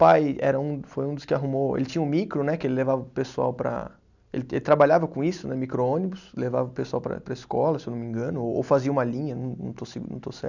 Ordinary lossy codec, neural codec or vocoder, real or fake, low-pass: none; none; real; 7.2 kHz